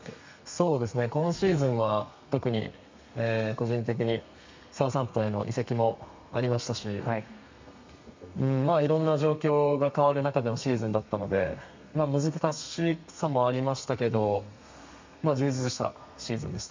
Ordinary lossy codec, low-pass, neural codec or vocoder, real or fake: MP3, 64 kbps; 7.2 kHz; codec, 32 kHz, 1.9 kbps, SNAC; fake